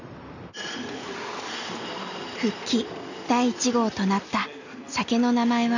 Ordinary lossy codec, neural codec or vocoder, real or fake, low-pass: none; none; real; 7.2 kHz